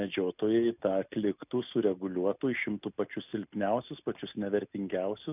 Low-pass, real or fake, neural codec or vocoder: 3.6 kHz; real; none